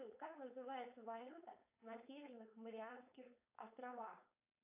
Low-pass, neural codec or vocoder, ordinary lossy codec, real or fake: 3.6 kHz; codec, 16 kHz, 4.8 kbps, FACodec; AAC, 32 kbps; fake